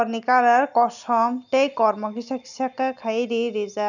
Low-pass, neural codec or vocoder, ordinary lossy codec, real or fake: 7.2 kHz; none; none; real